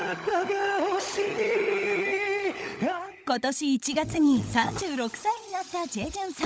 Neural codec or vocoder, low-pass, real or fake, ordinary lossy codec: codec, 16 kHz, 16 kbps, FunCodec, trained on LibriTTS, 50 frames a second; none; fake; none